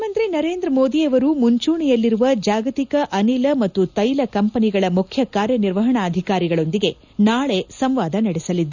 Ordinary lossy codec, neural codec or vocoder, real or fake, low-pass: none; none; real; 7.2 kHz